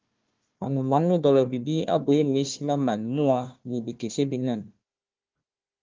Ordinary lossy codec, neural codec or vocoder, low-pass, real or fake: Opus, 32 kbps; codec, 16 kHz, 1 kbps, FunCodec, trained on Chinese and English, 50 frames a second; 7.2 kHz; fake